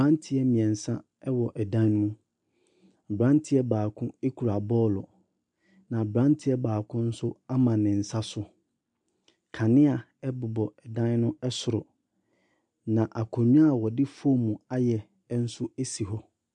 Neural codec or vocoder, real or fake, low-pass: none; real; 10.8 kHz